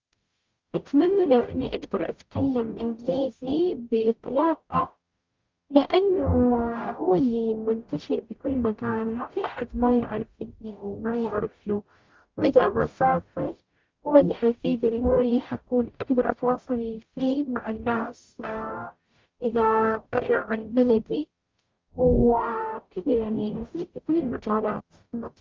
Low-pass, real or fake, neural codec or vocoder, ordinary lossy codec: 7.2 kHz; fake; codec, 44.1 kHz, 0.9 kbps, DAC; Opus, 24 kbps